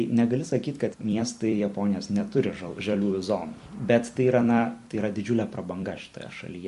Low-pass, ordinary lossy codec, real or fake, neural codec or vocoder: 14.4 kHz; MP3, 48 kbps; fake; vocoder, 44.1 kHz, 128 mel bands every 256 samples, BigVGAN v2